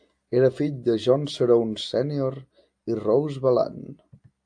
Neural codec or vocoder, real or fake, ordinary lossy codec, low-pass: none; real; AAC, 64 kbps; 9.9 kHz